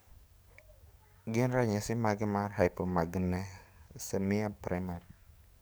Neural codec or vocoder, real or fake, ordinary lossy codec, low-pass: codec, 44.1 kHz, 7.8 kbps, DAC; fake; none; none